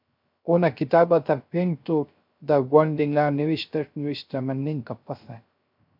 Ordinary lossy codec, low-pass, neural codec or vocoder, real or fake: MP3, 48 kbps; 5.4 kHz; codec, 16 kHz, 0.3 kbps, FocalCodec; fake